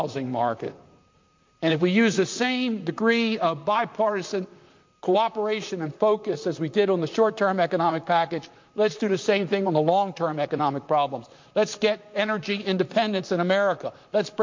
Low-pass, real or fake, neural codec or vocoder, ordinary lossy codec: 7.2 kHz; fake; vocoder, 44.1 kHz, 128 mel bands, Pupu-Vocoder; MP3, 48 kbps